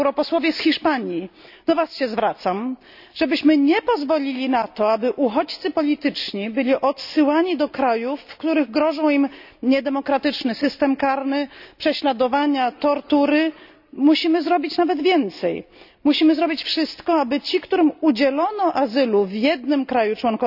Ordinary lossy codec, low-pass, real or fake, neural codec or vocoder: none; 5.4 kHz; real; none